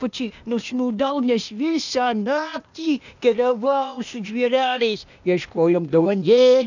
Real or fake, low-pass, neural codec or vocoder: fake; 7.2 kHz; codec, 16 kHz, 0.8 kbps, ZipCodec